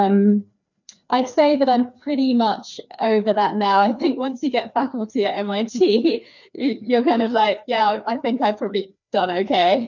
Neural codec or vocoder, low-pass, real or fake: codec, 16 kHz, 2 kbps, FreqCodec, larger model; 7.2 kHz; fake